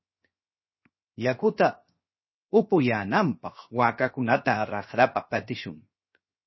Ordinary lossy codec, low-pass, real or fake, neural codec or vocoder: MP3, 24 kbps; 7.2 kHz; fake; codec, 16 kHz, 0.7 kbps, FocalCodec